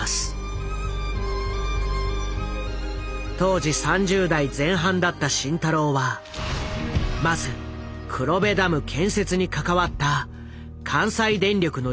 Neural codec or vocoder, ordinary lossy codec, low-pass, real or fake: none; none; none; real